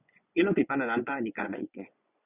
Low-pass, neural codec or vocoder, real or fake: 3.6 kHz; vocoder, 24 kHz, 100 mel bands, Vocos; fake